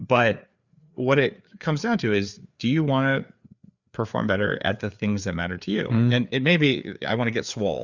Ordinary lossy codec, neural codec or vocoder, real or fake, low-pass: Opus, 64 kbps; codec, 16 kHz, 4 kbps, FreqCodec, larger model; fake; 7.2 kHz